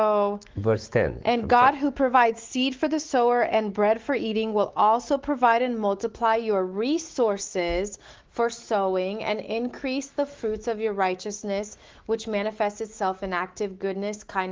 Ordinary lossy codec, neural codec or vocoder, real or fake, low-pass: Opus, 16 kbps; autoencoder, 48 kHz, 128 numbers a frame, DAC-VAE, trained on Japanese speech; fake; 7.2 kHz